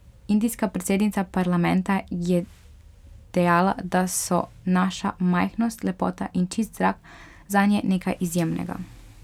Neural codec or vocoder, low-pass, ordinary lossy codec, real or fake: none; 19.8 kHz; none; real